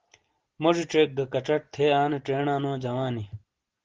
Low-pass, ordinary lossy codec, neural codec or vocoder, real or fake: 7.2 kHz; Opus, 16 kbps; none; real